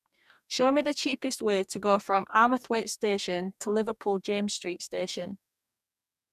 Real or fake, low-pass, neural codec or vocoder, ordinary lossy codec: fake; 14.4 kHz; codec, 44.1 kHz, 2.6 kbps, DAC; none